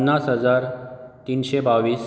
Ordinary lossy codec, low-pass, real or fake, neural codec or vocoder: none; none; real; none